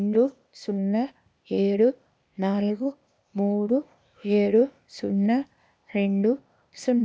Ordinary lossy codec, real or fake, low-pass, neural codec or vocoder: none; fake; none; codec, 16 kHz, 0.8 kbps, ZipCodec